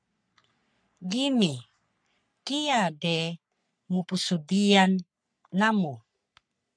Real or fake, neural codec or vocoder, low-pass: fake; codec, 44.1 kHz, 3.4 kbps, Pupu-Codec; 9.9 kHz